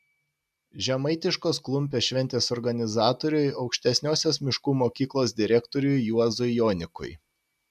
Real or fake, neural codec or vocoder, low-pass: real; none; 14.4 kHz